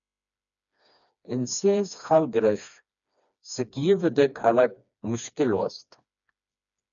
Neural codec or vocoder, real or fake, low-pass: codec, 16 kHz, 2 kbps, FreqCodec, smaller model; fake; 7.2 kHz